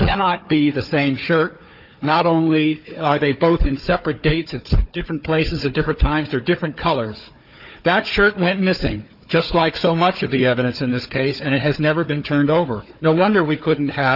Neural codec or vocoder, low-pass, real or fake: codec, 16 kHz, 4 kbps, FunCodec, trained on Chinese and English, 50 frames a second; 5.4 kHz; fake